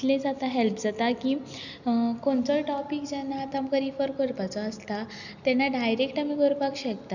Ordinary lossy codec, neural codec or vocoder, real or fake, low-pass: none; none; real; 7.2 kHz